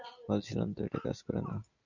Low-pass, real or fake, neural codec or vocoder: 7.2 kHz; real; none